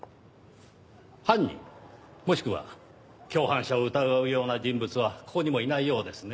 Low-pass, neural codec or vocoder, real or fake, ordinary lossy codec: none; none; real; none